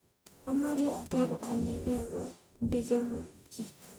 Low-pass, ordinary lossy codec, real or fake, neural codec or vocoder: none; none; fake; codec, 44.1 kHz, 0.9 kbps, DAC